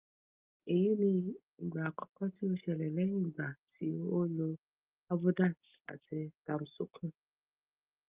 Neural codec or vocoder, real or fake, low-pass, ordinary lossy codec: none; real; 3.6 kHz; Opus, 32 kbps